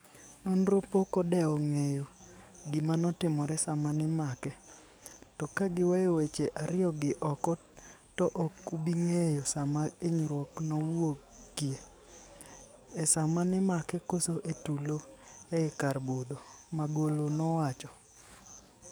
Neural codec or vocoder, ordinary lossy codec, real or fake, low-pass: codec, 44.1 kHz, 7.8 kbps, DAC; none; fake; none